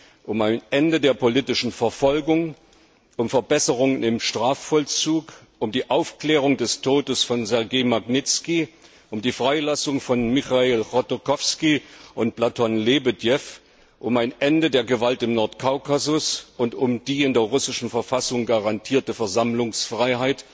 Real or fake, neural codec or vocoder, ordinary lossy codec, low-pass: real; none; none; none